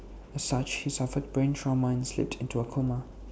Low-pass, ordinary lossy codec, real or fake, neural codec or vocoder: none; none; real; none